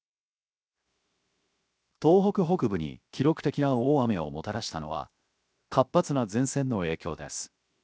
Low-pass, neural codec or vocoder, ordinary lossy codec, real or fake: none; codec, 16 kHz, 0.7 kbps, FocalCodec; none; fake